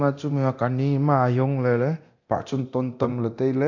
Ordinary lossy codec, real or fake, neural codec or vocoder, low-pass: none; fake; codec, 24 kHz, 0.9 kbps, DualCodec; 7.2 kHz